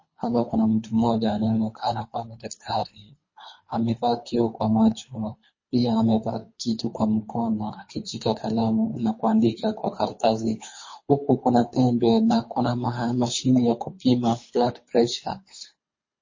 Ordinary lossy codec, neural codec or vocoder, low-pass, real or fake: MP3, 32 kbps; codec, 24 kHz, 3 kbps, HILCodec; 7.2 kHz; fake